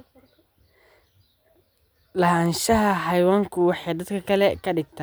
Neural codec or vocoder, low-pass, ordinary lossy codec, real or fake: none; none; none; real